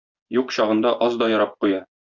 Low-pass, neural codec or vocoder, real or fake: 7.2 kHz; vocoder, 24 kHz, 100 mel bands, Vocos; fake